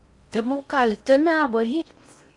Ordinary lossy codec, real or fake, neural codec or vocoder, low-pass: MP3, 64 kbps; fake; codec, 16 kHz in and 24 kHz out, 0.6 kbps, FocalCodec, streaming, 4096 codes; 10.8 kHz